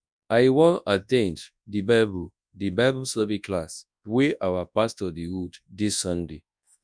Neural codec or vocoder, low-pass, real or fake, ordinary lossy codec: codec, 24 kHz, 0.9 kbps, WavTokenizer, large speech release; 9.9 kHz; fake; none